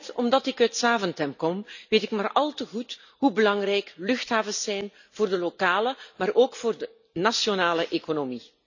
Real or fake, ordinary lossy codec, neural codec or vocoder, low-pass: real; none; none; 7.2 kHz